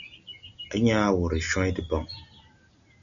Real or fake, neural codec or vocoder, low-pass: real; none; 7.2 kHz